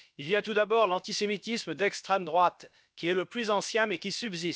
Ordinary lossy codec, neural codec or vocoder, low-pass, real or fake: none; codec, 16 kHz, about 1 kbps, DyCAST, with the encoder's durations; none; fake